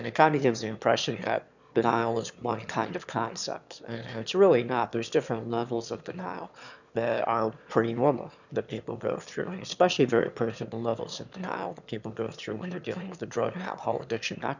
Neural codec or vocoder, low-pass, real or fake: autoencoder, 22.05 kHz, a latent of 192 numbers a frame, VITS, trained on one speaker; 7.2 kHz; fake